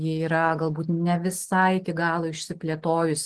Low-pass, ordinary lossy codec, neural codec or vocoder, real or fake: 10.8 kHz; Opus, 16 kbps; none; real